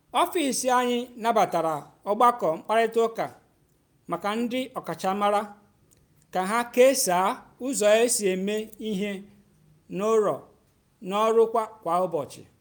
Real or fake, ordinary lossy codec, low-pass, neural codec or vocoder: real; none; none; none